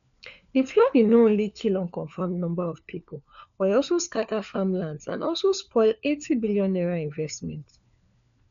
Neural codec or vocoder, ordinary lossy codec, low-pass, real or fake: codec, 16 kHz, 4 kbps, FunCodec, trained on LibriTTS, 50 frames a second; none; 7.2 kHz; fake